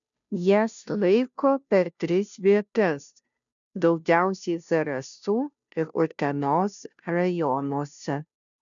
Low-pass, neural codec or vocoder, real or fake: 7.2 kHz; codec, 16 kHz, 0.5 kbps, FunCodec, trained on Chinese and English, 25 frames a second; fake